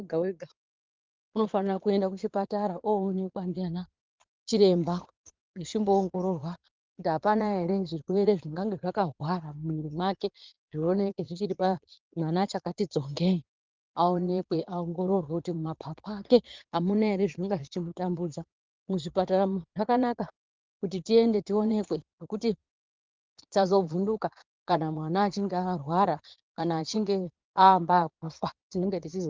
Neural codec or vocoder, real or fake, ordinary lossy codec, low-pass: vocoder, 22.05 kHz, 80 mel bands, Vocos; fake; Opus, 32 kbps; 7.2 kHz